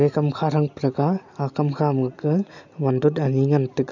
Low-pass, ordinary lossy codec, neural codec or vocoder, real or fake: 7.2 kHz; none; none; real